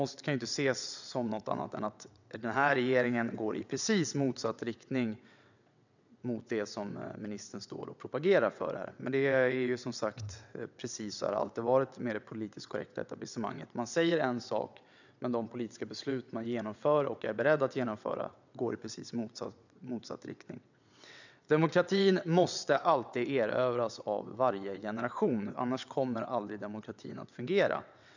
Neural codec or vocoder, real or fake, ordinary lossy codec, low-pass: vocoder, 22.05 kHz, 80 mel bands, WaveNeXt; fake; none; 7.2 kHz